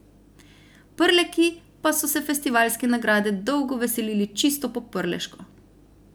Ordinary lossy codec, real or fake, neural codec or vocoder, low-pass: none; real; none; none